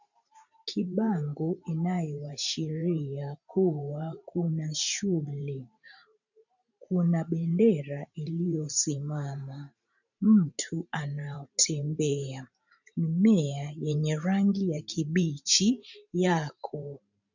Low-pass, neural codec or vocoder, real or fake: 7.2 kHz; vocoder, 44.1 kHz, 128 mel bands every 512 samples, BigVGAN v2; fake